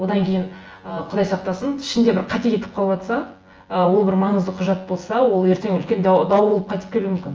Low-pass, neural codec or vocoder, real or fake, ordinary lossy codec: 7.2 kHz; vocoder, 24 kHz, 100 mel bands, Vocos; fake; Opus, 24 kbps